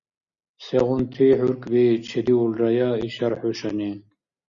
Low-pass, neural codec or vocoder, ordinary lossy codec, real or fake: 7.2 kHz; none; Opus, 64 kbps; real